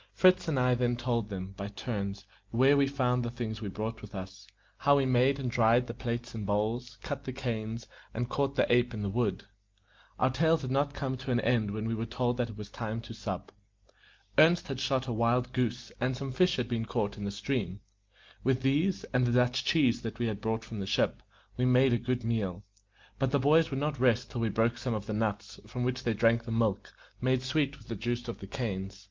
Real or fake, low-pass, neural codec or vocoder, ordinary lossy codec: real; 7.2 kHz; none; Opus, 32 kbps